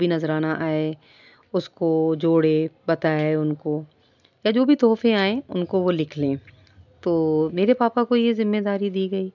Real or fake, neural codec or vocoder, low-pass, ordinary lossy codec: real; none; 7.2 kHz; none